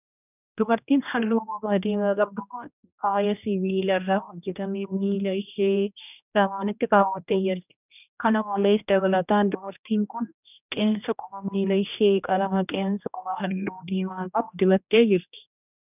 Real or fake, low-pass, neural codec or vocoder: fake; 3.6 kHz; codec, 16 kHz, 1 kbps, X-Codec, HuBERT features, trained on general audio